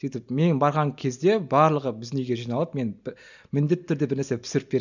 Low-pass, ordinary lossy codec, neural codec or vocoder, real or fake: 7.2 kHz; none; none; real